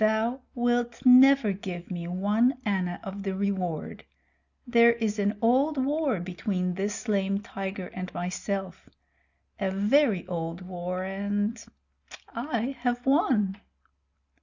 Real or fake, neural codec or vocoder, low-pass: real; none; 7.2 kHz